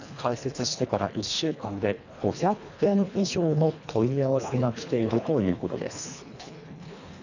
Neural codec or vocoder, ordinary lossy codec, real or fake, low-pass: codec, 24 kHz, 1.5 kbps, HILCodec; none; fake; 7.2 kHz